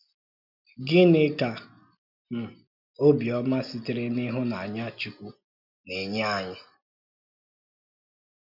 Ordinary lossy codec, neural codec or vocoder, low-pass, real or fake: none; none; 5.4 kHz; real